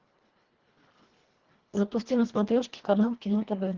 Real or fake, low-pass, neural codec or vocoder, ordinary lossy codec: fake; 7.2 kHz; codec, 24 kHz, 1.5 kbps, HILCodec; Opus, 16 kbps